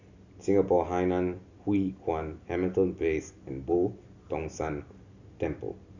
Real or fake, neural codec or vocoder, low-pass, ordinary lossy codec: real; none; 7.2 kHz; Opus, 64 kbps